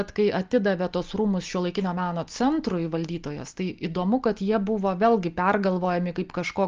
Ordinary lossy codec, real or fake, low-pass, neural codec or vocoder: Opus, 24 kbps; real; 7.2 kHz; none